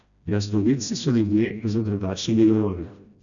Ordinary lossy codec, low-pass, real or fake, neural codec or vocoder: none; 7.2 kHz; fake; codec, 16 kHz, 1 kbps, FreqCodec, smaller model